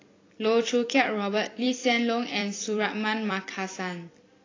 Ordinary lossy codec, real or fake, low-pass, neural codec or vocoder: AAC, 32 kbps; fake; 7.2 kHz; vocoder, 44.1 kHz, 128 mel bands every 256 samples, BigVGAN v2